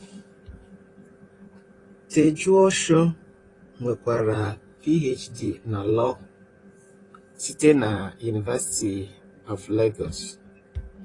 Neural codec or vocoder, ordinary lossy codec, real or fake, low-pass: vocoder, 44.1 kHz, 128 mel bands, Pupu-Vocoder; AAC, 32 kbps; fake; 10.8 kHz